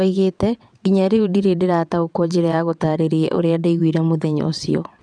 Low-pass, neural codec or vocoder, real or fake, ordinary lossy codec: 9.9 kHz; vocoder, 22.05 kHz, 80 mel bands, WaveNeXt; fake; none